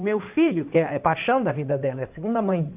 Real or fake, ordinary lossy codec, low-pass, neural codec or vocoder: fake; AAC, 32 kbps; 3.6 kHz; codec, 16 kHz in and 24 kHz out, 2.2 kbps, FireRedTTS-2 codec